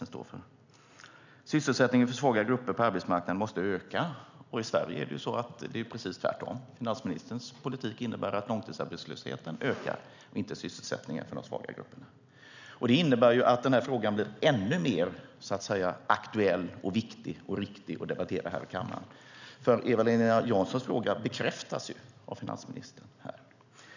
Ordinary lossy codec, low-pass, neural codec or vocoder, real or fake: none; 7.2 kHz; none; real